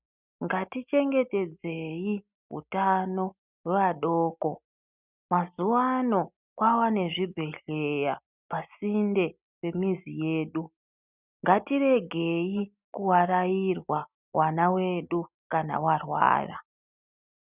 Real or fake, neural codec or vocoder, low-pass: real; none; 3.6 kHz